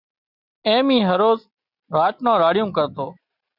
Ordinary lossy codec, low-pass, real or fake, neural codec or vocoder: Opus, 64 kbps; 5.4 kHz; real; none